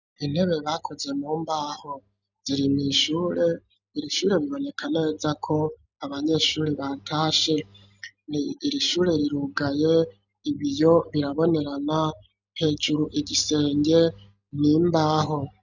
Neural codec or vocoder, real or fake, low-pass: none; real; 7.2 kHz